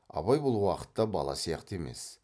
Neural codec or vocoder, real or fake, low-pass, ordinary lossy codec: none; real; none; none